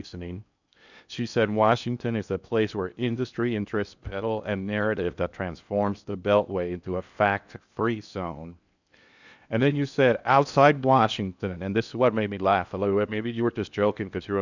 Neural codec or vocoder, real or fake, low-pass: codec, 16 kHz in and 24 kHz out, 0.8 kbps, FocalCodec, streaming, 65536 codes; fake; 7.2 kHz